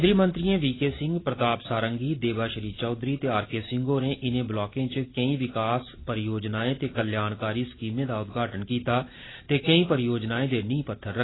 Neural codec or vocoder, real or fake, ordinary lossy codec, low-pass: none; real; AAC, 16 kbps; 7.2 kHz